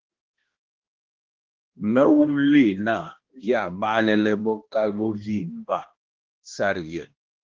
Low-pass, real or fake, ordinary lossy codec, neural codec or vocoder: 7.2 kHz; fake; Opus, 16 kbps; codec, 16 kHz, 1 kbps, X-Codec, HuBERT features, trained on balanced general audio